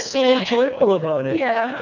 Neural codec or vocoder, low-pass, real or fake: codec, 24 kHz, 1.5 kbps, HILCodec; 7.2 kHz; fake